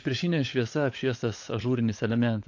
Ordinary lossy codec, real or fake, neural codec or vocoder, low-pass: AAC, 48 kbps; fake; codec, 16 kHz, 16 kbps, FunCodec, trained on LibriTTS, 50 frames a second; 7.2 kHz